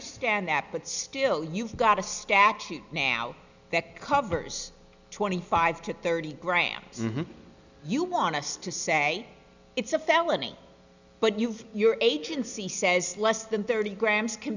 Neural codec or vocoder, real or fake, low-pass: none; real; 7.2 kHz